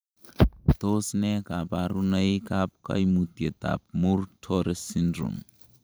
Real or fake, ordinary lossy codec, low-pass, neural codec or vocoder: fake; none; none; vocoder, 44.1 kHz, 128 mel bands every 512 samples, BigVGAN v2